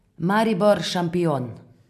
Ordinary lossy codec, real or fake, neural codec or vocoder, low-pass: none; real; none; 14.4 kHz